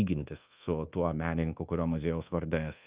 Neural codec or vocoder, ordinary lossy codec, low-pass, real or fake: codec, 24 kHz, 1.2 kbps, DualCodec; Opus, 32 kbps; 3.6 kHz; fake